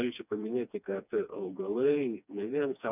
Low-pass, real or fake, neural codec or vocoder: 3.6 kHz; fake; codec, 16 kHz, 2 kbps, FreqCodec, smaller model